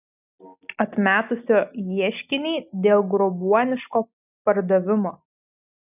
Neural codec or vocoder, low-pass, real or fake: none; 3.6 kHz; real